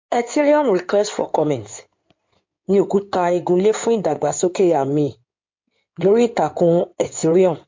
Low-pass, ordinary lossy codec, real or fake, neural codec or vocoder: 7.2 kHz; MP3, 48 kbps; fake; codec, 16 kHz in and 24 kHz out, 2.2 kbps, FireRedTTS-2 codec